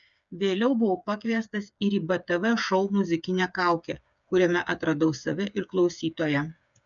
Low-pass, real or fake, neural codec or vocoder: 7.2 kHz; fake; codec, 16 kHz, 8 kbps, FreqCodec, smaller model